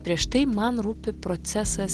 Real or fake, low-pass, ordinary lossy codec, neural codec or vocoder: real; 10.8 kHz; Opus, 16 kbps; none